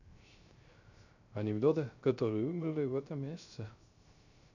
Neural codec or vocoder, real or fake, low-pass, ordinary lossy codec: codec, 16 kHz, 0.3 kbps, FocalCodec; fake; 7.2 kHz; none